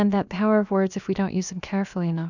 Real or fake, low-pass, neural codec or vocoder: fake; 7.2 kHz; codec, 16 kHz, about 1 kbps, DyCAST, with the encoder's durations